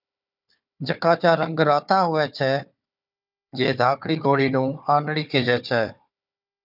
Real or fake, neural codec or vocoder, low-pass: fake; codec, 16 kHz, 4 kbps, FunCodec, trained on Chinese and English, 50 frames a second; 5.4 kHz